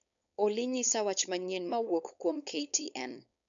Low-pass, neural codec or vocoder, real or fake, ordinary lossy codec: 7.2 kHz; codec, 16 kHz, 4.8 kbps, FACodec; fake; none